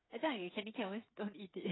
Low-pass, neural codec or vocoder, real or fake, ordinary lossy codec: 7.2 kHz; codec, 16 kHz, 8 kbps, FreqCodec, smaller model; fake; AAC, 16 kbps